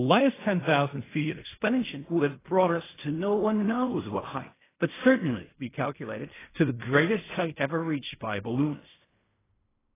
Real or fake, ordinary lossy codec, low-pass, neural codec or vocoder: fake; AAC, 16 kbps; 3.6 kHz; codec, 16 kHz in and 24 kHz out, 0.4 kbps, LongCat-Audio-Codec, fine tuned four codebook decoder